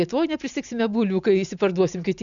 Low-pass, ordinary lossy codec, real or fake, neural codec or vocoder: 7.2 kHz; MP3, 96 kbps; real; none